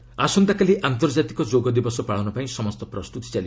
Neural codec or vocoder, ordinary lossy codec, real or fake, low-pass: none; none; real; none